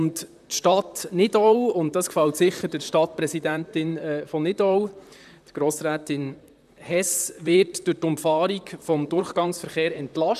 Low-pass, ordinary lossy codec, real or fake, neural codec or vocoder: 14.4 kHz; none; fake; vocoder, 44.1 kHz, 128 mel bands, Pupu-Vocoder